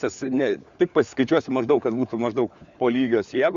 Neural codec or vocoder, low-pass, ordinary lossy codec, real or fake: codec, 16 kHz, 4 kbps, FunCodec, trained on LibriTTS, 50 frames a second; 7.2 kHz; Opus, 64 kbps; fake